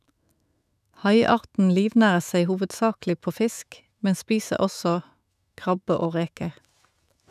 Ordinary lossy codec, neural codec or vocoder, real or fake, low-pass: none; autoencoder, 48 kHz, 128 numbers a frame, DAC-VAE, trained on Japanese speech; fake; 14.4 kHz